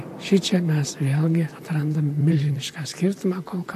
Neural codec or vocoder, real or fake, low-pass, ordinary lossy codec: vocoder, 44.1 kHz, 128 mel bands, Pupu-Vocoder; fake; 14.4 kHz; AAC, 64 kbps